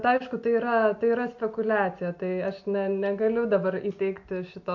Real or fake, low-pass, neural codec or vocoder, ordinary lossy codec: real; 7.2 kHz; none; MP3, 48 kbps